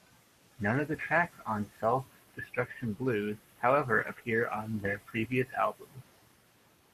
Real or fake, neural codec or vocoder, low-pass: fake; codec, 44.1 kHz, 7.8 kbps, Pupu-Codec; 14.4 kHz